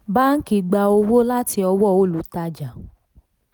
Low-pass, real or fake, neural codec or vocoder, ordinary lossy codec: none; real; none; none